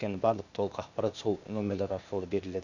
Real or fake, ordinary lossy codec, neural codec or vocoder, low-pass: fake; none; codec, 16 kHz, 0.8 kbps, ZipCodec; 7.2 kHz